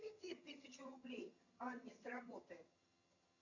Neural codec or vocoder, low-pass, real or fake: vocoder, 22.05 kHz, 80 mel bands, HiFi-GAN; 7.2 kHz; fake